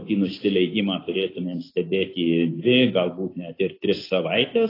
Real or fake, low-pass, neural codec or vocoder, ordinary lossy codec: real; 5.4 kHz; none; AAC, 24 kbps